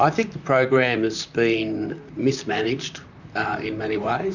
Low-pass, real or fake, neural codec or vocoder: 7.2 kHz; fake; vocoder, 44.1 kHz, 128 mel bands, Pupu-Vocoder